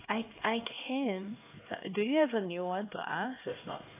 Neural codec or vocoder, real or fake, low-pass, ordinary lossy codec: codec, 16 kHz, 2 kbps, X-Codec, HuBERT features, trained on LibriSpeech; fake; 3.6 kHz; AAC, 24 kbps